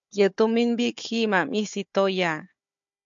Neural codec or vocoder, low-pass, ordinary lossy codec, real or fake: codec, 16 kHz, 4 kbps, FunCodec, trained on Chinese and English, 50 frames a second; 7.2 kHz; MP3, 64 kbps; fake